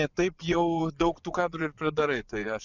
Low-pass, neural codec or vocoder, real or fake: 7.2 kHz; vocoder, 24 kHz, 100 mel bands, Vocos; fake